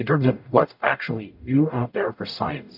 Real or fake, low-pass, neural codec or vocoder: fake; 5.4 kHz; codec, 44.1 kHz, 0.9 kbps, DAC